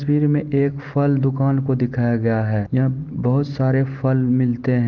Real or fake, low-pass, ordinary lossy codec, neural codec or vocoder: real; 7.2 kHz; Opus, 32 kbps; none